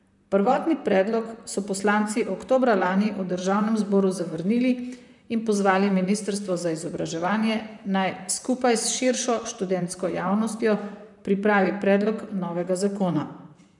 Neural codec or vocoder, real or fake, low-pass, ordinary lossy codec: vocoder, 44.1 kHz, 128 mel bands, Pupu-Vocoder; fake; 10.8 kHz; none